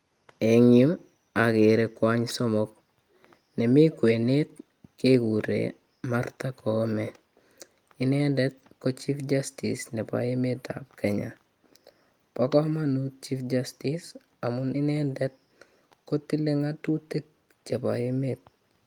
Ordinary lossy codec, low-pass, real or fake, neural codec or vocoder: Opus, 32 kbps; 19.8 kHz; real; none